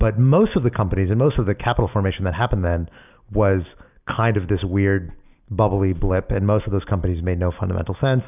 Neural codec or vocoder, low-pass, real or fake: none; 3.6 kHz; real